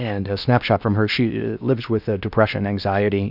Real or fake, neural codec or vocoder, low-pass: fake; codec, 16 kHz in and 24 kHz out, 0.6 kbps, FocalCodec, streaming, 2048 codes; 5.4 kHz